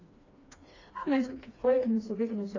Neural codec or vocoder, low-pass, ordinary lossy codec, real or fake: codec, 16 kHz, 2 kbps, FreqCodec, smaller model; 7.2 kHz; AAC, 32 kbps; fake